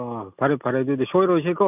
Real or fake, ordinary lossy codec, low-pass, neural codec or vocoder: real; none; 3.6 kHz; none